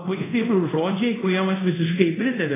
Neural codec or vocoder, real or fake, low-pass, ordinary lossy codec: codec, 24 kHz, 0.5 kbps, DualCodec; fake; 3.6 kHz; AAC, 16 kbps